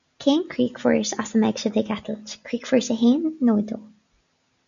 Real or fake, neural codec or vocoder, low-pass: real; none; 7.2 kHz